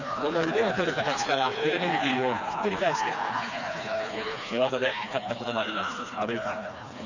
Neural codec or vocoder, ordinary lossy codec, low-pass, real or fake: codec, 16 kHz, 2 kbps, FreqCodec, smaller model; none; 7.2 kHz; fake